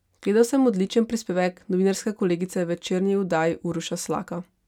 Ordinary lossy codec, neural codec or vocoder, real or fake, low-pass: none; none; real; 19.8 kHz